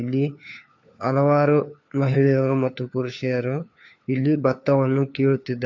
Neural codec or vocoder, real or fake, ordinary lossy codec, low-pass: codec, 16 kHz, 4 kbps, FunCodec, trained on LibriTTS, 50 frames a second; fake; AAC, 48 kbps; 7.2 kHz